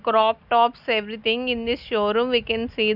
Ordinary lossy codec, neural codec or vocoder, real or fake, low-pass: none; none; real; 5.4 kHz